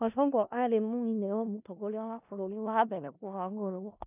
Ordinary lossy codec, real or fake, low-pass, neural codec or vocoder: none; fake; 3.6 kHz; codec, 16 kHz in and 24 kHz out, 0.4 kbps, LongCat-Audio-Codec, four codebook decoder